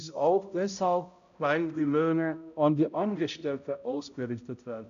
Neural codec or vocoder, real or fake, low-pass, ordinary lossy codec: codec, 16 kHz, 0.5 kbps, X-Codec, HuBERT features, trained on balanced general audio; fake; 7.2 kHz; AAC, 96 kbps